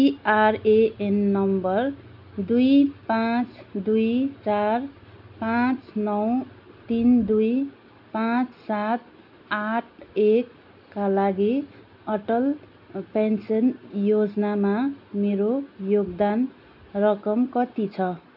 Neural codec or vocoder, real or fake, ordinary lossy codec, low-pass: none; real; AAC, 48 kbps; 5.4 kHz